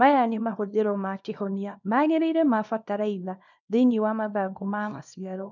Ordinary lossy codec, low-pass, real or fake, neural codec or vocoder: none; 7.2 kHz; fake; codec, 24 kHz, 0.9 kbps, WavTokenizer, small release